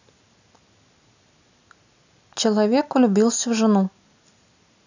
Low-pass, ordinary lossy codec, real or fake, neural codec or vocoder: 7.2 kHz; none; real; none